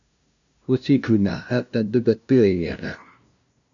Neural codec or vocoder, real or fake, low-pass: codec, 16 kHz, 0.5 kbps, FunCodec, trained on LibriTTS, 25 frames a second; fake; 7.2 kHz